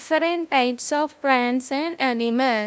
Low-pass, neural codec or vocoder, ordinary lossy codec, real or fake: none; codec, 16 kHz, 0.5 kbps, FunCodec, trained on LibriTTS, 25 frames a second; none; fake